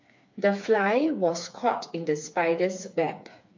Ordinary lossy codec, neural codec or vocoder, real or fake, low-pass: MP3, 48 kbps; codec, 16 kHz, 4 kbps, FreqCodec, smaller model; fake; 7.2 kHz